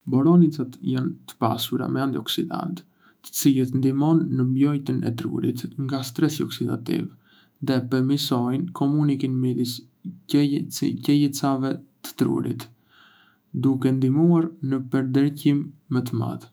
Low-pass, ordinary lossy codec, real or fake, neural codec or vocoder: none; none; fake; autoencoder, 48 kHz, 128 numbers a frame, DAC-VAE, trained on Japanese speech